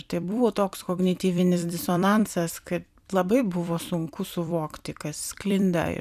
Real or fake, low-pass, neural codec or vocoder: fake; 14.4 kHz; vocoder, 44.1 kHz, 128 mel bands every 256 samples, BigVGAN v2